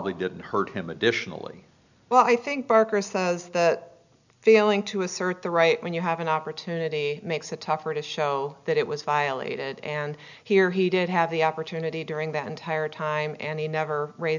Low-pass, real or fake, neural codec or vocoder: 7.2 kHz; real; none